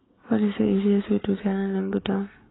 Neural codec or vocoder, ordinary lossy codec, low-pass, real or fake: codec, 44.1 kHz, 7.8 kbps, Pupu-Codec; AAC, 16 kbps; 7.2 kHz; fake